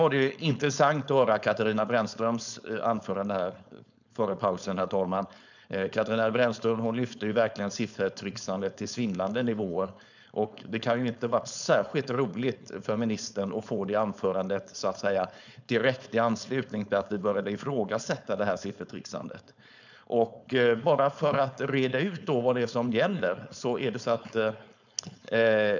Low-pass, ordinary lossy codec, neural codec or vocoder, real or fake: 7.2 kHz; none; codec, 16 kHz, 4.8 kbps, FACodec; fake